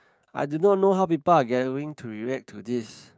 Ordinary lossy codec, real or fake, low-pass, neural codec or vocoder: none; fake; none; codec, 16 kHz, 6 kbps, DAC